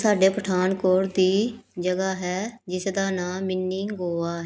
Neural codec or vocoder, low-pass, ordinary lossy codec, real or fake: none; none; none; real